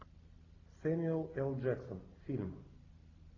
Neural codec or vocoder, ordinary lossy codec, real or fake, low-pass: none; AAC, 32 kbps; real; 7.2 kHz